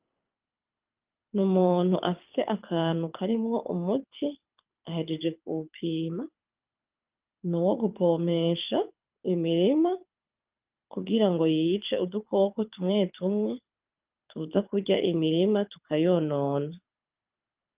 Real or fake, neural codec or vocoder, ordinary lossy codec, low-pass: fake; codec, 24 kHz, 6 kbps, HILCodec; Opus, 24 kbps; 3.6 kHz